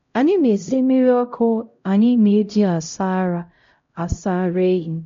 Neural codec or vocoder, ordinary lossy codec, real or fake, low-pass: codec, 16 kHz, 0.5 kbps, X-Codec, HuBERT features, trained on LibriSpeech; MP3, 48 kbps; fake; 7.2 kHz